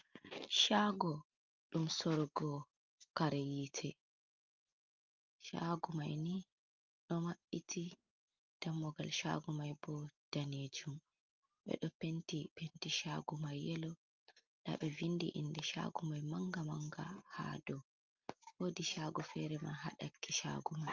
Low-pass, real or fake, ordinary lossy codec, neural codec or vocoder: 7.2 kHz; real; Opus, 24 kbps; none